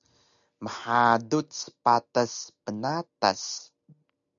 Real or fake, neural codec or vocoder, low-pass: real; none; 7.2 kHz